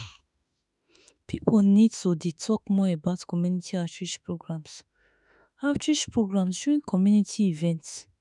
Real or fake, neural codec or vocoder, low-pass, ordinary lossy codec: fake; autoencoder, 48 kHz, 32 numbers a frame, DAC-VAE, trained on Japanese speech; 10.8 kHz; none